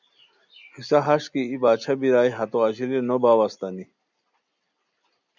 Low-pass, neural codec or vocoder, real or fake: 7.2 kHz; none; real